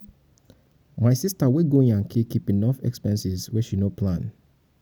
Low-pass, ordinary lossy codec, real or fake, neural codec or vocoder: none; none; real; none